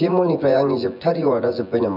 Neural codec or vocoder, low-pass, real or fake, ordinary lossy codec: vocoder, 24 kHz, 100 mel bands, Vocos; 5.4 kHz; fake; none